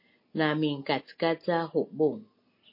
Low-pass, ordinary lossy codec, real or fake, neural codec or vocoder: 5.4 kHz; MP3, 32 kbps; real; none